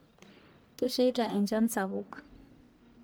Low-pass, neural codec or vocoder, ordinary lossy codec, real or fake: none; codec, 44.1 kHz, 1.7 kbps, Pupu-Codec; none; fake